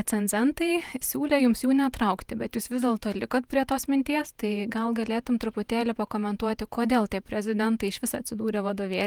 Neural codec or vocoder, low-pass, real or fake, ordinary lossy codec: vocoder, 48 kHz, 128 mel bands, Vocos; 19.8 kHz; fake; Opus, 32 kbps